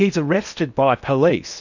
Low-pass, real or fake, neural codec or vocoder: 7.2 kHz; fake; codec, 16 kHz in and 24 kHz out, 0.8 kbps, FocalCodec, streaming, 65536 codes